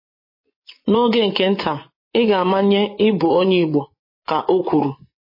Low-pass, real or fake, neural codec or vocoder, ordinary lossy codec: 5.4 kHz; fake; vocoder, 24 kHz, 100 mel bands, Vocos; MP3, 24 kbps